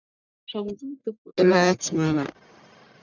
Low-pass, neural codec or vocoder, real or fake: 7.2 kHz; codec, 44.1 kHz, 1.7 kbps, Pupu-Codec; fake